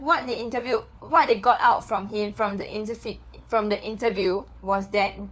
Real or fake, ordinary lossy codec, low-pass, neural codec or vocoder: fake; none; none; codec, 16 kHz, 4 kbps, FunCodec, trained on LibriTTS, 50 frames a second